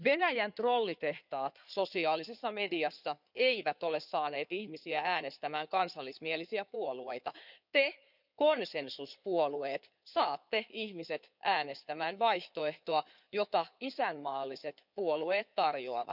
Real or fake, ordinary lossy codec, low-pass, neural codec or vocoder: fake; none; 5.4 kHz; codec, 16 kHz in and 24 kHz out, 2.2 kbps, FireRedTTS-2 codec